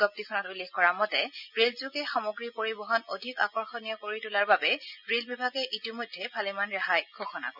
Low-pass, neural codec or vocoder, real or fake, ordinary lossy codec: 5.4 kHz; none; real; none